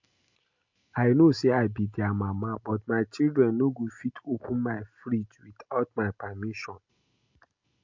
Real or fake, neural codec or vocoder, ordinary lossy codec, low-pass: real; none; MP3, 48 kbps; 7.2 kHz